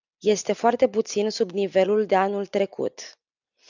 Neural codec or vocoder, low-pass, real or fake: none; 7.2 kHz; real